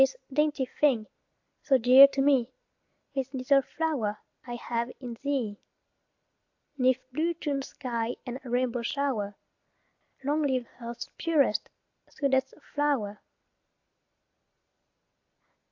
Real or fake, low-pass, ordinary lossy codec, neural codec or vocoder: real; 7.2 kHz; AAC, 48 kbps; none